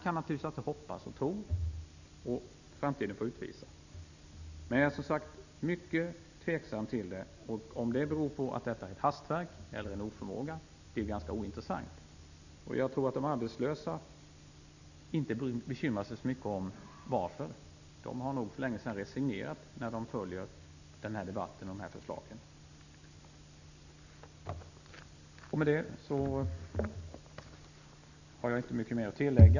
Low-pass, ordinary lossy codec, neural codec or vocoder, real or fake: 7.2 kHz; none; none; real